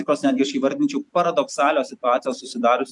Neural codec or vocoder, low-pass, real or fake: vocoder, 44.1 kHz, 128 mel bands every 256 samples, BigVGAN v2; 10.8 kHz; fake